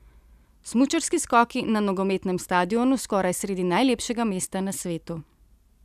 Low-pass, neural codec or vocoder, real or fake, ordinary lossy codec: 14.4 kHz; none; real; none